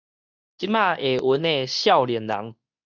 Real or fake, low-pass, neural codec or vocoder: fake; 7.2 kHz; codec, 24 kHz, 0.9 kbps, WavTokenizer, medium speech release version 2